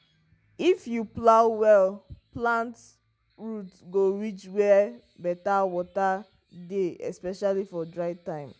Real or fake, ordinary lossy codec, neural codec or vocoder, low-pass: real; none; none; none